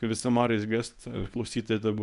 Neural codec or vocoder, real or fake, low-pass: codec, 24 kHz, 0.9 kbps, WavTokenizer, medium speech release version 1; fake; 10.8 kHz